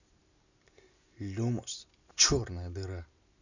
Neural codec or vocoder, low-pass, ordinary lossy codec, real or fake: none; 7.2 kHz; none; real